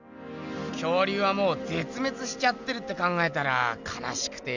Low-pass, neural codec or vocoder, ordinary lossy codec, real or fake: 7.2 kHz; none; none; real